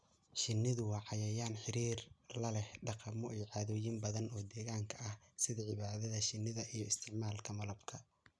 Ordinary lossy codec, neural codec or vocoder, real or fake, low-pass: none; none; real; none